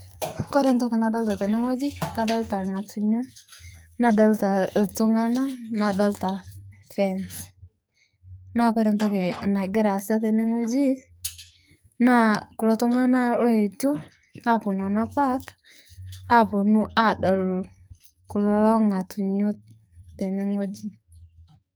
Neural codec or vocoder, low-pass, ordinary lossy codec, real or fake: codec, 44.1 kHz, 2.6 kbps, SNAC; none; none; fake